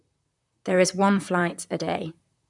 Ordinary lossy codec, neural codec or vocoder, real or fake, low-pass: none; vocoder, 44.1 kHz, 128 mel bands, Pupu-Vocoder; fake; 10.8 kHz